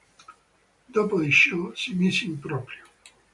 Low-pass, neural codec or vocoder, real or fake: 10.8 kHz; none; real